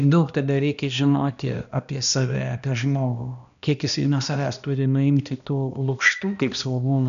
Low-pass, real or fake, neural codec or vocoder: 7.2 kHz; fake; codec, 16 kHz, 1 kbps, X-Codec, HuBERT features, trained on balanced general audio